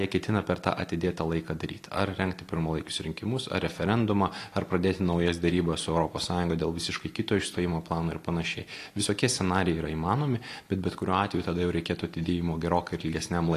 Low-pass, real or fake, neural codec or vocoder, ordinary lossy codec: 14.4 kHz; real; none; AAC, 48 kbps